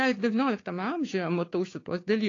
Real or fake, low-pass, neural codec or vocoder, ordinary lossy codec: fake; 7.2 kHz; codec, 16 kHz, 6 kbps, DAC; AAC, 32 kbps